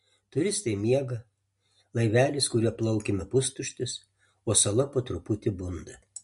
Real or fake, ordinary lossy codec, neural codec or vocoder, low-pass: real; MP3, 48 kbps; none; 14.4 kHz